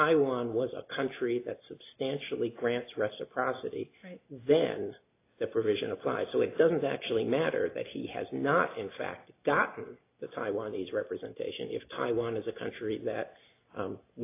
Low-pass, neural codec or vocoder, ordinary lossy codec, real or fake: 3.6 kHz; none; AAC, 24 kbps; real